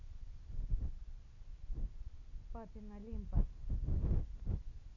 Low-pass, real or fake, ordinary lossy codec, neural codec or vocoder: 7.2 kHz; real; none; none